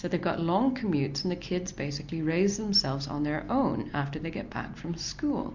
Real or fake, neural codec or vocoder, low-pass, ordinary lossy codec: real; none; 7.2 kHz; MP3, 64 kbps